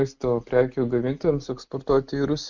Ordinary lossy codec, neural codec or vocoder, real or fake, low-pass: Opus, 64 kbps; none; real; 7.2 kHz